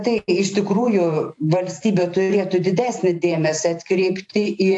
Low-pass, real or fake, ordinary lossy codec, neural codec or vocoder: 10.8 kHz; fake; AAC, 64 kbps; vocoder, 44.1 kHz, 128 mel bands every 512 samples, BigVGAN v2